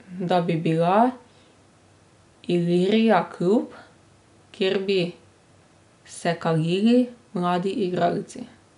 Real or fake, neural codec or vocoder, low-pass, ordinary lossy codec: real; none; 10.8 kHz; none